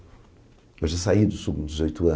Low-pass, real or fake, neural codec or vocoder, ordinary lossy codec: none; real; none; none